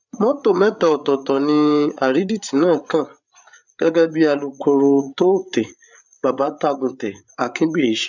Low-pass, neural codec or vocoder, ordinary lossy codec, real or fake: 7.2 kHz; codec, 16 kHz, 8 kbps, FreqCodec, larger model; none; fake